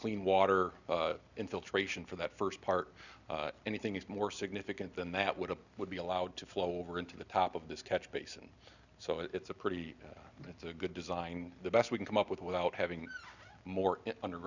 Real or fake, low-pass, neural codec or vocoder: real; 7.2 kHz; none